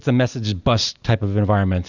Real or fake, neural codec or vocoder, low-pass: real; none; 7.2 kHz